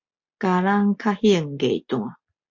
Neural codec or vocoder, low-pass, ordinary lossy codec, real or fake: none; 7.2 kHz; MP3, 64 kbps; real